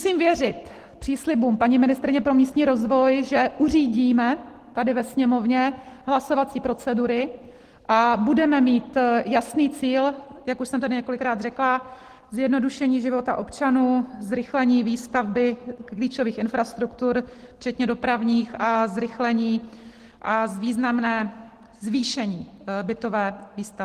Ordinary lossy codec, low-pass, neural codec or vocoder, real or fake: Opus, 16 kbps; 14.4 kHz; none; real